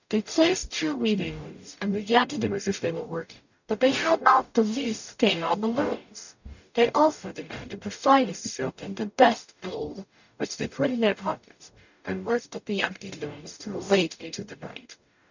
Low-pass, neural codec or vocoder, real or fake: 7.2 kHz; codec, 44.1 kHz, 0.9 kbps, DAC; fake